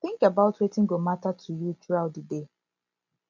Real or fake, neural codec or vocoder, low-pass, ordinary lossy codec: real; none; 7.2 kHz; none